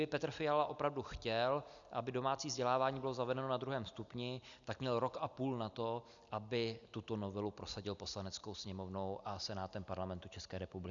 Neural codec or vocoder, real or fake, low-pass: none; real; 7.2 kHz